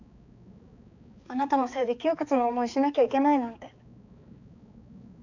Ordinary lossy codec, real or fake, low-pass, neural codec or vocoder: none; fake; 7.2 kHz; codec, 16 kHz, 4 kbps, X-Codec, HuBERT features, trained on balanced general audio